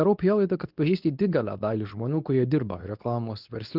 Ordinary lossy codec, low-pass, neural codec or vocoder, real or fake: Opus, 24 kbps; 5.4 kHz; codec, 24 kHz, 0.9 kbps, WavTokenizer, medium speech release version 2; fake